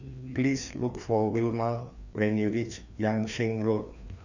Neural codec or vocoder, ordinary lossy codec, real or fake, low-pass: codec, 16 kHz, 2 kbps, FreqCodec, larger model; none; fake; 7.2 kHz